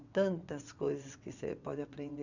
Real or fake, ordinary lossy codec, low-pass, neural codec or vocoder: fake; none; 7.2 kHz; vocoder, 22.05 kHz, 80 mel bands, WaveNeXt